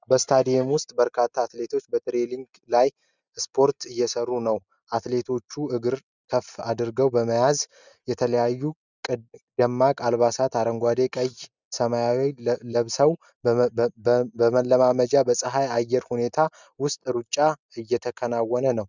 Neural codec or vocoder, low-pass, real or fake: none; 7.2 kHz; real